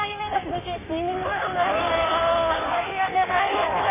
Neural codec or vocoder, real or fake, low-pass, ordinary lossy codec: codec, 16 kHz in and 24 kHz out, 1.1 kbps, FireRedTTS-2 codec; fake; 3.6 kHz; MP3, 16 kbps